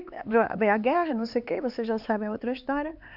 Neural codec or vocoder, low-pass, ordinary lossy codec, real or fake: codec, 16 kHz, 4 kbps, X-Codec, HuBERT features, trained on LibriSpeech; 5.4 kHz; AAC, 48 kbps; fake